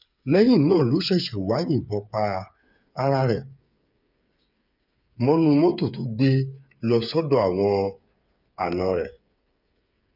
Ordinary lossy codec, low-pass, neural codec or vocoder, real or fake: none; 5.4 kHz; codec, 16 kHz, 8 kbps, FreqCodec, smaller model; fake